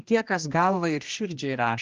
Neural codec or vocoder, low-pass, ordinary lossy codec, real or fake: codec, 16 kHz, 1 kbps, X-Codec, HuBERT features, trained on general audio; 7.2 kHz; Opus, 24 kbps; fake